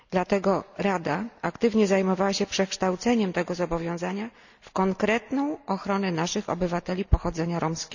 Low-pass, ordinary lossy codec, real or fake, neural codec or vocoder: 7.2 kHz; none; real; none